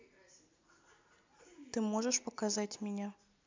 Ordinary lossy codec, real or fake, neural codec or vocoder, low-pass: MP3, 64 kbps; real; none; 7.2 kHz